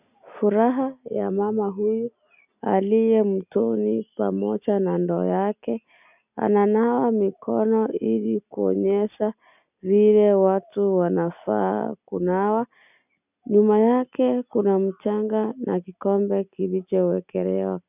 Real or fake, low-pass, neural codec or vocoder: real; 3.6 kHz; none